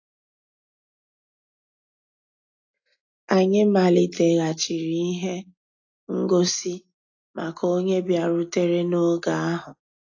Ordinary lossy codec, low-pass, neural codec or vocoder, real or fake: AAC, 48 kbps; 7.2 kHz; none; real